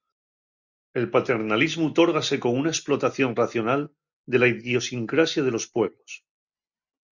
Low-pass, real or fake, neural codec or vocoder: 7.2 kHz; real; none